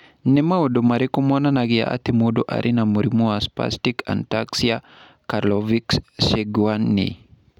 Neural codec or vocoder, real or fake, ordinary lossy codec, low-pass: none; real; none; 19.8 kHz